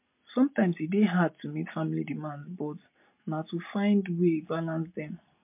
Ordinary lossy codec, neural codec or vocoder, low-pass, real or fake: MP3, 32 kbps; none; 3.6 kHz; real